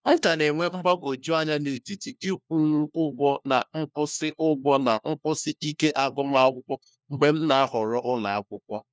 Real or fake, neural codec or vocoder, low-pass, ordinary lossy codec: fake; codec, 16 kHz, 1 kbps, FunCodec, trained on LibriTTS, 50 frames a second; none; none